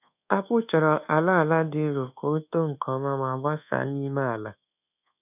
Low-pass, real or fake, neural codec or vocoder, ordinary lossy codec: 3.6 kHz; fake; codec, 24 kHz, 1.2 kbps, DualCodec; none